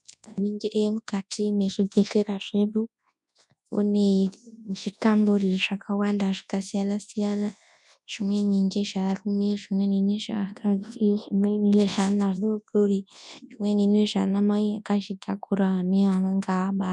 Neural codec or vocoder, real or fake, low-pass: codec, 24 kHz, 0.9 kbps, WavTokenizer, large speech release; fake; 10.8 kHz